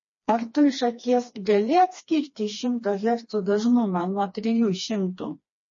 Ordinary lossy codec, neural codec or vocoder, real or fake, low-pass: MP3, 32 kbps; codec, 16 kHz, 2 kbps, FreqCodec, smaller model; fake; 7.2 kHz